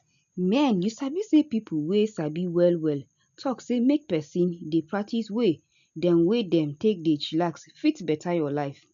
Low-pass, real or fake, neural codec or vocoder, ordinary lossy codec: 7.2 kHz; real; none; none